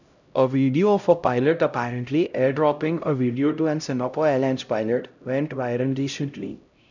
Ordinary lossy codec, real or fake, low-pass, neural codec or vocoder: none; fake; 7.2 kHz; codec, 16 kHz, 0.5 kbps, X-Codec, HuBERT features, trained on LibriSpeech